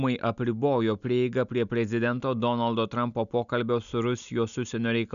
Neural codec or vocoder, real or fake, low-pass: none; real; 7.2 kHz